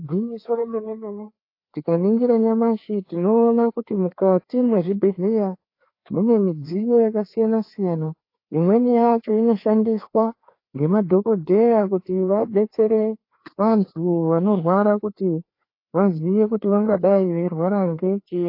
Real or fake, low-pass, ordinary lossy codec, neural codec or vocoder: fake; 5.4 kHz; AAC, 32 kbps; codec, 16 kHz, 2 kbps, FreqCodec, larger model